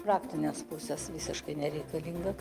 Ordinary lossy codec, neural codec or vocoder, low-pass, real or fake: Opus, 32 kbps; none; 14.4 kHz; real